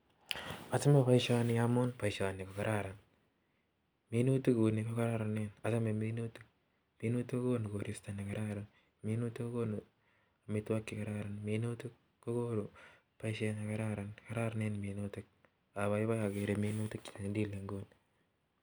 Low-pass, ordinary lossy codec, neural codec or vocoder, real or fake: none; none; none; real